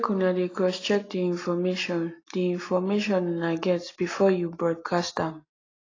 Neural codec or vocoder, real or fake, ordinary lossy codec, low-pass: none; real; AAC, 32 kbps; 7.2 kHz